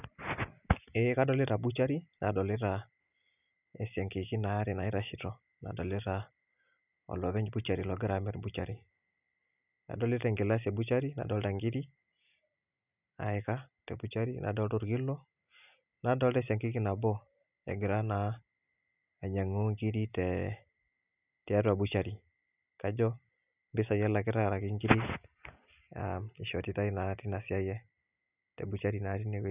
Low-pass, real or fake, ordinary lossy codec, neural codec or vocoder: 3.6 kHz; real; none; none